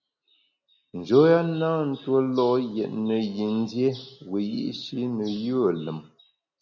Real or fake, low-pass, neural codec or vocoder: real; 7.2 kHz; none